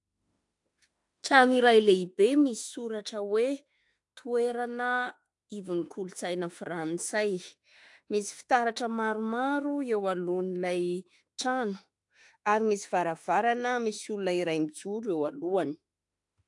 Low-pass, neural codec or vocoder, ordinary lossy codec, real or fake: 10.8 kHz; autoencoder, 48 kHz, 32 numbers a frame, DAC-VAE, trained on Japanese speech; AAC, 64 kbps; fake